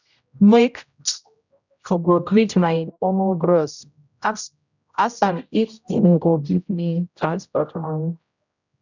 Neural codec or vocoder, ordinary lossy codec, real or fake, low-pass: codec, 16 kHz, 0.5 kbps, X-Codec, HuBERT features, trained on general audio; none; fake; 7.2 kHz